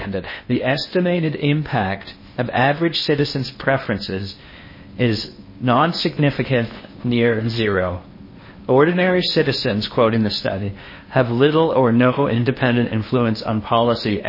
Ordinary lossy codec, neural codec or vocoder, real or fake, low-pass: MP3, 24 kbps; codec, 16 kHz in and 24 kHz out, 0.8 kbps, FocalCodec, streaming, 65536 codes; fake; 5.4 kHz